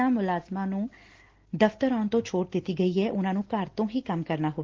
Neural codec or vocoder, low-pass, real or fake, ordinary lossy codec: none; 7.2 kHz; real; Opus, 16 kbps